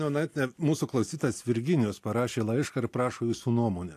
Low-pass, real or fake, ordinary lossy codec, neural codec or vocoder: 14.4 kHz; real; AAC, 64 kbps; none